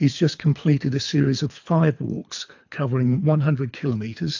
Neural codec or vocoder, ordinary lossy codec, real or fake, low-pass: codec, 24 kHz, 3 kbps, HILCodec; AAC, 48 kbps; fake; 7.2 kHz